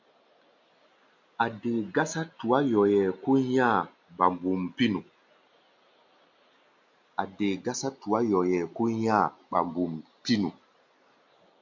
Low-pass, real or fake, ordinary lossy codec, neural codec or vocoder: 7.2 kHz; real; MP3, 64 kbps; none